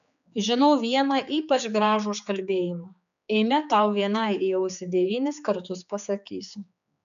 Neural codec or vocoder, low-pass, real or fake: codec, 16 kHz, 4 kbps, X-Codec, HuBERT features, trained on general audio; 7.2 kHz; fake